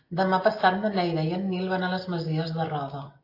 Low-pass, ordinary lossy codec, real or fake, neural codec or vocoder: 5.4 kHz; AAC, 32 kbps; real; none